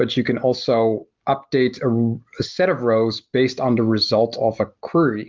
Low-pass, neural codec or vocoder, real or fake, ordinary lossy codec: 7.2 kHz; none; real; Opus, 32 kbps